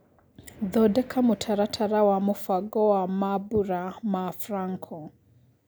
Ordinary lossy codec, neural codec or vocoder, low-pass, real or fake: none; none; none; real